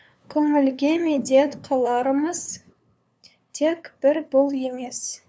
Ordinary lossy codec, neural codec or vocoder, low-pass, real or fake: none; codec, 16 kHz, 2 kbps, FunCodec, trained on LibriTTS, 25 frames a second; none; fake